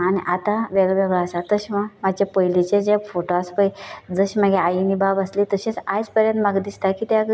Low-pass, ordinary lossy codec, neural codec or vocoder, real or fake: none; none; none; real